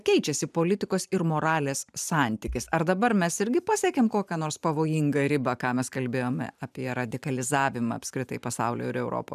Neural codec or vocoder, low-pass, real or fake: vocoder, 44.1 kHz, 128 mel bands every 512 samples, BigVGAN v2; 14.4 kHz; fake